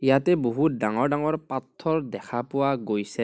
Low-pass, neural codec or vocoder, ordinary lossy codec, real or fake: none; none; none; real